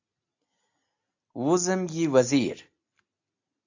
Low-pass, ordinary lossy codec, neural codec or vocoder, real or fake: 7.2 kHz; AAC, 48 kbps; none; real